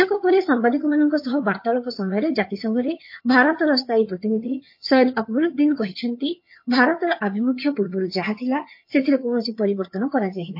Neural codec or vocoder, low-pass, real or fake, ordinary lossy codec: vocoder, 22.05 kHz, 80 mel bands, HiFi-GAN; 5.4 kHz; fake; MP3, 32 kbps